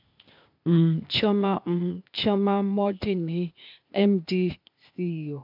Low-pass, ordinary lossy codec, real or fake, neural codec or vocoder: 5.4 kHz; MP3, 48 kbps; fake; codec, 16 kHz, 0.8 kbps, ZipCodec